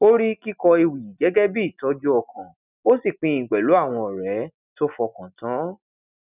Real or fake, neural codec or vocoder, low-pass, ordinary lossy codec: real; none; 3.6 kHz; none